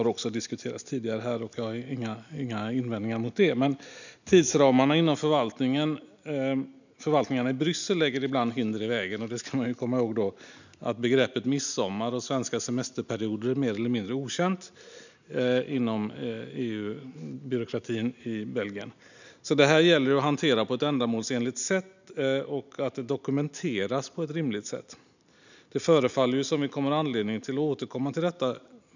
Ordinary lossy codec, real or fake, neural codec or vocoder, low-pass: none; real; none; 7.2 kHz